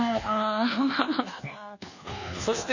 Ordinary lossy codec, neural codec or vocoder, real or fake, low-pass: none; codec, 24 kHz, 1.2 kbps, DualCodec; fake; 7.2 kHz